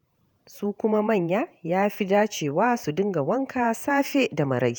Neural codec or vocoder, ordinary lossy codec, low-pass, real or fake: vocoder, 48 kHz, 128 mel bands, Vocos; none; none; fake